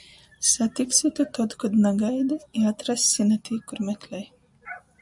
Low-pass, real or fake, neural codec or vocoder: 10.8 kHz; real; none